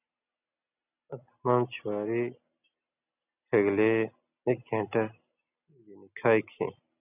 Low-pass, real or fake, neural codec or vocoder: 3.6 kHz; real; none